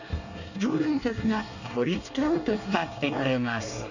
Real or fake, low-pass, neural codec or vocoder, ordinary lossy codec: fake; 7.2 kHz; codec, 24 kHz, 1 kbps, SNAC; none